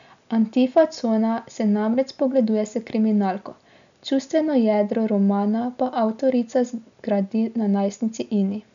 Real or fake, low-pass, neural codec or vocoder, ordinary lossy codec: real; 7.2 kHz; none; none